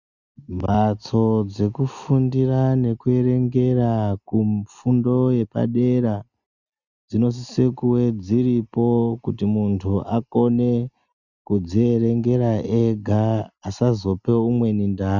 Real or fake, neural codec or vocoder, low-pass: real; none; 7.2 kHz